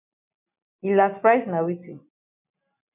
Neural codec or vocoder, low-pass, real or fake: none; 3.6 kHz; real